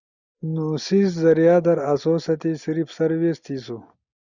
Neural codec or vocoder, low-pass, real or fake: none; 7.2 kHz; real